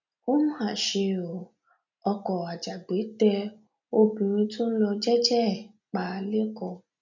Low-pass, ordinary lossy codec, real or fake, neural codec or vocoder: 7.2 kHz; none; real; none